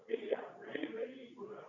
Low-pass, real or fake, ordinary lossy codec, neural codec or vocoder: 7.2 kHz; fake; none; codec, 24 kHz, 0.9 kbps, WavTokenizer, medium speech release version 2